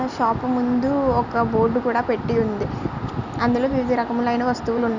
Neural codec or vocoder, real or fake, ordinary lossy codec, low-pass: none; real; none; 7.2 kHz